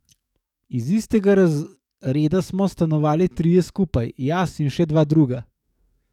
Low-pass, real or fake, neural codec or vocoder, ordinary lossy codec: 19.8 kHz; fake; codec, 44.1 kHz, 7.8 kbps, DAC; none